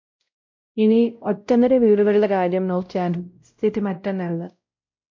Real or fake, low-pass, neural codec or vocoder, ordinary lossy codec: fake; 7.2 kHz; codec, 16 kHz, 0.5 kbps, X-Codec, WavLM features, trained on Multilingual LibriSpeech; MP3, 48 kbps